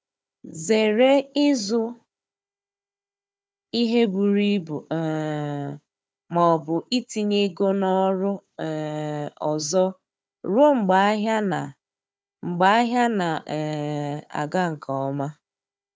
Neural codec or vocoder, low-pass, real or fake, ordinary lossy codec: codec, 16 kHz, 4 kbps, FunCodec, trained on Chinese and English, 50 frames a second; none; fake; none